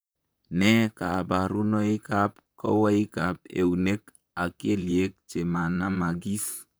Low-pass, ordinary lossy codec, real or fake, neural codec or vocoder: none; none; fake; vocoder, 44.1 kHz, 128 mel bands, Pupu-Vocoder